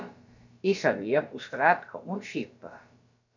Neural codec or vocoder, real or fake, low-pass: codec, 16 kHz, about 1 kbps, DyCAST, with the encoder's durations; fake; 7.2 kHz